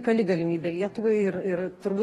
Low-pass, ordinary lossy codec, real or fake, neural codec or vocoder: 19.8 kHz; AAC, 32 kbps; fake; codec, 44.1 kHz, 2.6 kbps, DAC